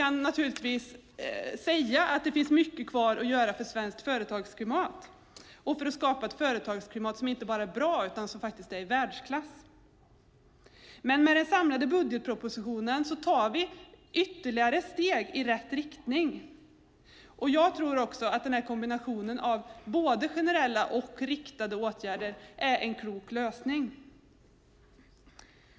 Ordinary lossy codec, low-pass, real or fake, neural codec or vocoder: none; none; real; none